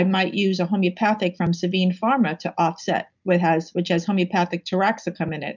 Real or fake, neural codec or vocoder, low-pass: real; none; 7.2 kHz